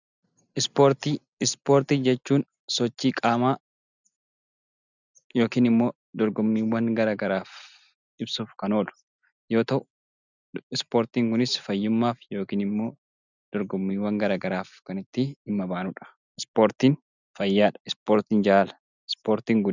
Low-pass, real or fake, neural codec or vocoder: 7.2 kHz; real; none